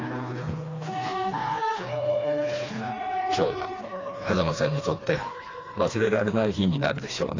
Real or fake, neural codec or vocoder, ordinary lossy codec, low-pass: fake; codec, 16 kHz, 2 kbps, FreqCodec, smaller model; AAC, 32 kbps; 7.2 kHz